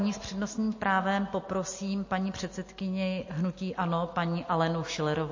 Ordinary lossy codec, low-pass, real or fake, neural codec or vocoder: MP3, 32 kbps; 7.2 kHz; real; none